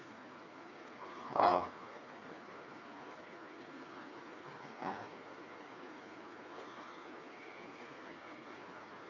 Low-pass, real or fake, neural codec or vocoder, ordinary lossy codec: 7.2 kHz; fake; codec, 16 kHz, 4 kbps, FreqCodec, smaller model; none